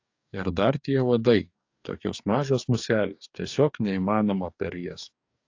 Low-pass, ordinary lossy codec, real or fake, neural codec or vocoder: 7.2 kHz; AAC, 48 kbps; fake; codec, 44.1 kHz, 2.6 kbps, DAC